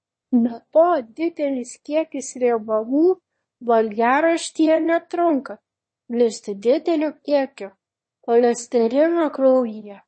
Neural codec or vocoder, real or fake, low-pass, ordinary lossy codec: autoencoder, 22.05 kHz, a latent of 192 numbers a frame, VITS, trained on one speaker; fake; 9.9 kHz; MP3, 32 kbps